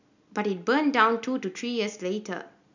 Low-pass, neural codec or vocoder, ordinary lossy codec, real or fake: 7.2 kHz; none; none; real